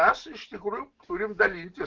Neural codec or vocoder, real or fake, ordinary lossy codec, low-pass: none; real; Opus, 16 kbps; 7.2 kHz